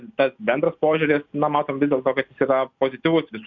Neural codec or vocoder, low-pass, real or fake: none; 7.2 kHz; real